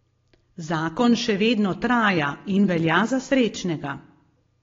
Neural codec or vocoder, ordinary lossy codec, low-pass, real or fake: none; AAC, 32 kbps; 7.2 kHz; real